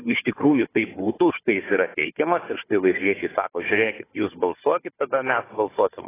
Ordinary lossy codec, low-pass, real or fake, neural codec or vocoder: AAC, 16 kbps; 3.6 kHz; fake; codec, 16 kHz, 4 kbps, FunCodec, trained on Chinese and English, 50 frames a second